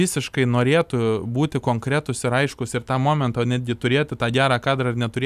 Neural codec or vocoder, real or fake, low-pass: none; real; 14.4 kHz